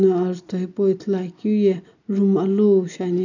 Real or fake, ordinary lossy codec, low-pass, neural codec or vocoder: real; none; 7.2 kHz; none